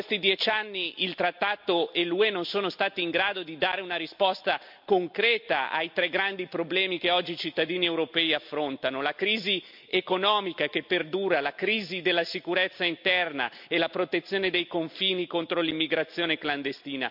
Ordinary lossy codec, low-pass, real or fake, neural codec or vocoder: MP3, 48 kbps; 5.4 kHz; real; none